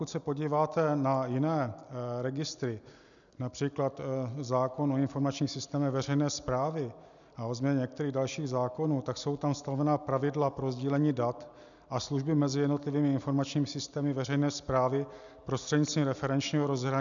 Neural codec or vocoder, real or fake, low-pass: none; real; 7.2 kHz